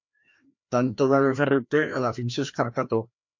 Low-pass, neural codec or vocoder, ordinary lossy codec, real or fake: 7.2 kHz; codec, 16 kHz, 1 kbps, FreqCodec, larger model; MP3, 48 kbps; fake